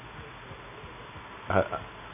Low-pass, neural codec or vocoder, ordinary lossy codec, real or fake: 3.6 kHz; none; none; real